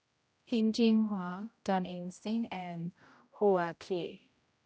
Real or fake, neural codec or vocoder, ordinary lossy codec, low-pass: fake; codec, 16 kHz, 0.5 kbps, X-Codec, HuBERT features, trained on general audio; none; none